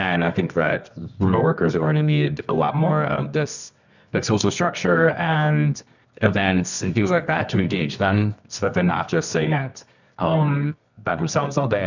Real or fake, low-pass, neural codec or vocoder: fake; 7.2 kHz; codec, 24 kHz, 0.9 kbps, WavTokenizer, medium music audio release